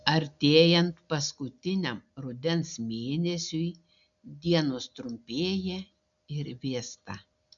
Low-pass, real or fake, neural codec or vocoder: 7.2 kHz; real; none